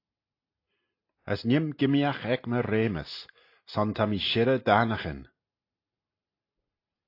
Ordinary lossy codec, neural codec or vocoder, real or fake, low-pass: AAC, 32 kbps; none; real; 5.4 kHz